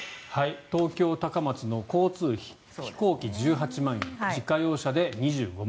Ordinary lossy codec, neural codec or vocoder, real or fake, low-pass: none; none; real; none